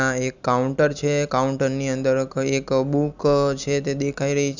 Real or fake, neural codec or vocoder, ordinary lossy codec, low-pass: real; none; none; 7.2 kHz